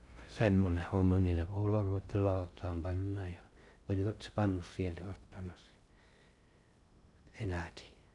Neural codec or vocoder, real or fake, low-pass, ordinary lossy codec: codec, 16 kHz in and 24 kHz out, 0.6 kbps, FocalCodec, streaming, 2048 codes; fake; 10.8 kHz; none